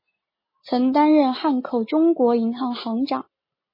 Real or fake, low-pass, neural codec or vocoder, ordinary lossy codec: real; 5.4 kHz; none; MP3, 24 kbps